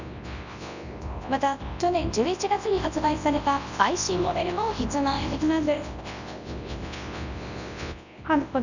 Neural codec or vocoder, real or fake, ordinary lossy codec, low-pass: codec, 24 kHz, 0.9 kbps, WavTokenizer, large speech release; fake; none; 7.2 kHz